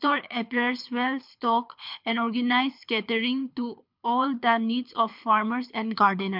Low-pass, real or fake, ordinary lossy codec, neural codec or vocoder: 5.4 kHz; fake; MP3, 48 kbps; codec, 24 kHz, 6 kbps, HILCodec